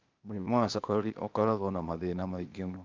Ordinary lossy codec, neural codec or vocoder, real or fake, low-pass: Opus, 24 kbps; codec, 16 kHz, 0.8 kbps, ZipCodec; fake; 7.2 kHz